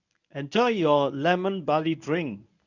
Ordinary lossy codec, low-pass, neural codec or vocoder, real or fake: none; 7.2 kHz; codec, 24 kHz, 0.9 kbps, WavTokenizer, medium speech release version 1; fake